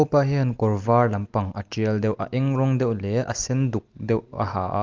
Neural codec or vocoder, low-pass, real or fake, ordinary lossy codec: none; 7.2 kHz; real; Opus, 24 kbps